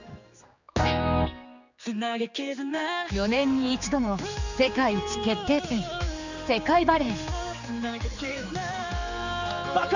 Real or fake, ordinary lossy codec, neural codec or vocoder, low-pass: fake; none; codec, 16 kHz, 4 kbps, X-Codec, HuBERT features, trained on general audio; 7.2 kHz